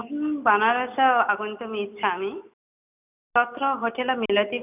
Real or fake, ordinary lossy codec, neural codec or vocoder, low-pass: real; none; none; 3.6 kHz